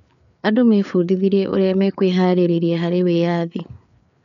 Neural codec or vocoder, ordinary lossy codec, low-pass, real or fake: codec, 16 kHz, 4 kbps, FreqCodec, larger model; none; 7.2 kHz; fake